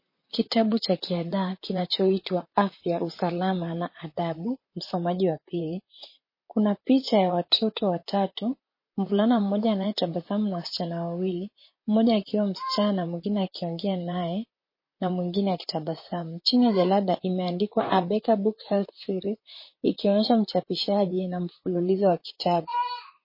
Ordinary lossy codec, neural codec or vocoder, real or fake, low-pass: MP3, 24 kbps; vocoder, 44.1 kHz, 128 mel bands, Pupu-Vocoder; fake; 5.4 kHz